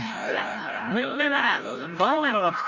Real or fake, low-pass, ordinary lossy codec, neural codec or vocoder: fake; 7.2 kHz; none; codec, 16 kHz, 0.5 kbps, FreqCodec, larger model